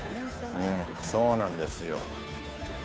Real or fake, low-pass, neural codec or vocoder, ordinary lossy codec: fake; none; codec, 16 kHz, 2 kbps, FunCodec, trained on Chinese and English, 25 frames a second; none